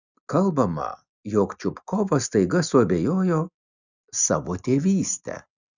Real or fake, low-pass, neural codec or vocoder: real; 7.2 kHz; none